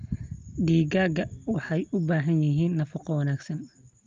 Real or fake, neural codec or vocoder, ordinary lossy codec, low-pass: real; none; Opus, 32 kbps; 7.2 kHz